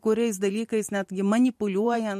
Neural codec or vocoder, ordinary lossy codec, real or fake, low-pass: vocoder, 44.1 kHz, 128 mel bands every 512 samples, BigVGAN v2; MP3, 64 kbps; fake; 14.4 kHz